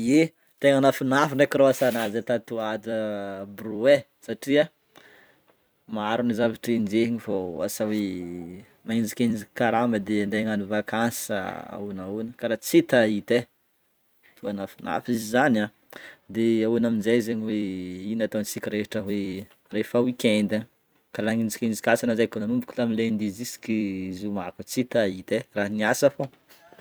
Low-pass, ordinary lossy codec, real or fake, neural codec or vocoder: none; none; fake; vocoder, 44.1 kHz, 128 mel bands every 256 samples, BigVGAN v2